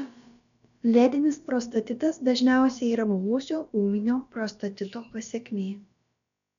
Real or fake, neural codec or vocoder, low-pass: fake; codec, 16 kHz, about 1 kbps, DyCAST, with the encoder's durations; 7.2 kHz